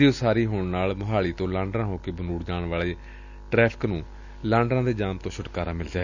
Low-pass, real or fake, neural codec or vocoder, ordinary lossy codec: 7.2 kHz; real; none; none